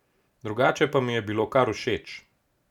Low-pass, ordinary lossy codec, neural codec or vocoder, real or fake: 19.8 kHz; none; none; real